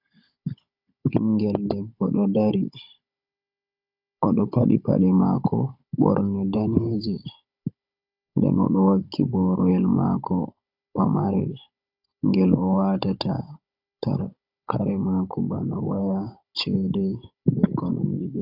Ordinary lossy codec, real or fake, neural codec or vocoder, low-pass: AAC, 48 kbps; fake; codec, 16 kHz, 16 kbps, FunCodec, trained on Chinese and English, 50 frames a second; 5.4 kHz